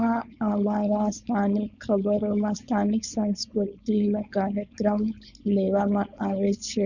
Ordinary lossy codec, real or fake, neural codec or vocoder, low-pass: none; fake; codec, 16 kHz, 4.8 kbps, FACodec; 7.2 kHz